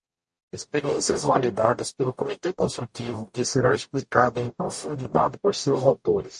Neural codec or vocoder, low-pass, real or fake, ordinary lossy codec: codec, 44.1 kHz, 0.9 kbps, DAC; 10.8 kHz; fake; MP3, 48 kbps